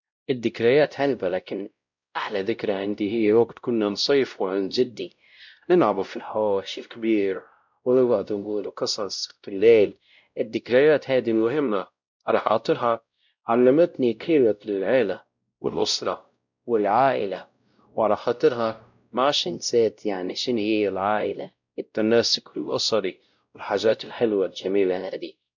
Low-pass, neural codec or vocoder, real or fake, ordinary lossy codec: 7.2 kHz; codec, 16 kHz, 0.5 kbps, X-Codec, WavLM features, trained on Multilingual LibriSpeech; fake; none